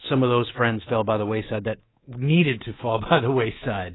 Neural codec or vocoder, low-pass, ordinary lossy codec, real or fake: codec, 24 kHz, 3.1 kbps, DualCodec; 7.2 kHz; AAC, 16 kbps; fake